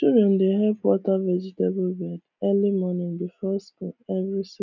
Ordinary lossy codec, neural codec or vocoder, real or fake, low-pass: none; none; real; 7.2 kHz